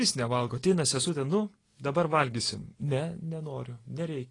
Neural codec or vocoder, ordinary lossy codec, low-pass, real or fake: none; AAC, 32 kbps; 10.8 kHz; real